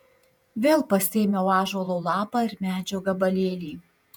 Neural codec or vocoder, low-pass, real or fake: vocoder, 48 kHz, 128 mel bands, Vocos; 19.8 kHz; fake